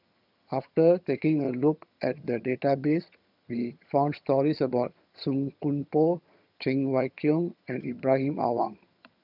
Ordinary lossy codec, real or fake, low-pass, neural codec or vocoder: none; fake; 5.4 kHz; vocoder, 22.05 kHz, 80 mel bands, HiFi-GAN